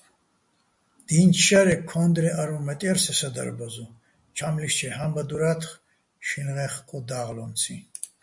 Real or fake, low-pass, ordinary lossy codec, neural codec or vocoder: real; 10.8 kHz; MP3, 64 kbps; none